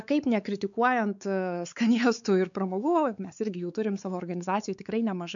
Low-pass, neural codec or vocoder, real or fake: 7.2 kHz; codec, 16 kHz, 4 kbps, X-Codec, WavLM features, trained on Multilingual LibriSpeech; fake